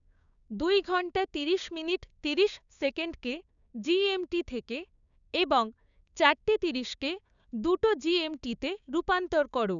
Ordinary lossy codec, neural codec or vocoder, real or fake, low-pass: none; codec, 16 kHz, 6 kbps, DAC; fake; 7.2 kHz